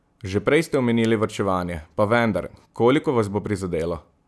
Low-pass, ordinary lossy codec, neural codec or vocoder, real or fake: none; none; none; real